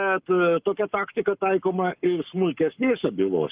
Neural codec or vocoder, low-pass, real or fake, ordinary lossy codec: codec, 44.1 kHz, 7.8 kbps, Pupu-Codec; 3.6 kHz; fake; Opus, 32 kbps